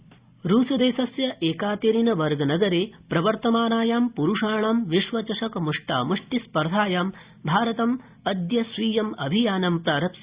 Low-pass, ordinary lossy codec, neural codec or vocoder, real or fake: 3.6 kHz; Opus, 64 kbps; none; real